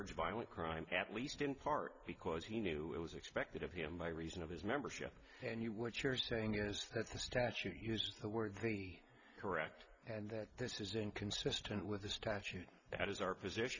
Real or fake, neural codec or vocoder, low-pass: real; none; 7.2 kHz